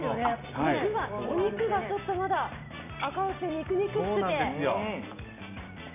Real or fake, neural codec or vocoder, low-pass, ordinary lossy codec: real; none; 3.6 kHz; none